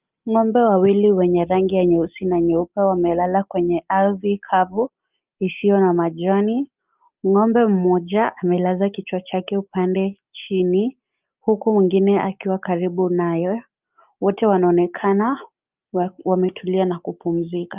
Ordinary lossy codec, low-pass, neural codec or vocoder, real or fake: Opus, 24 kbps; 3.6 kHz; none; real